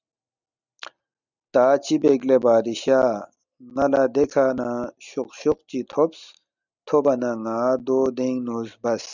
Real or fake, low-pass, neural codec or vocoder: real; 7.2 kHz; none